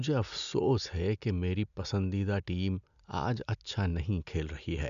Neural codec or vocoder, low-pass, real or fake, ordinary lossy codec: none; 7.2 kHz; real; none